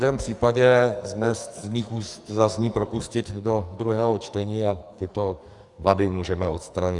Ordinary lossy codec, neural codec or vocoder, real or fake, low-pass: Opus, 64 kbps; codec, 44.1 kHz, 2.6 kbps, SNAC; fake; 10.8 kHz